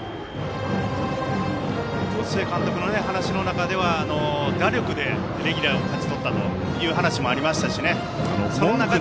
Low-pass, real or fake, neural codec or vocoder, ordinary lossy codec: none; real; none; none